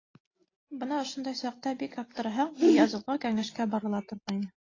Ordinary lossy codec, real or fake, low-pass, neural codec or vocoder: AAC, 32 kbps; real; 7.2 kHz; none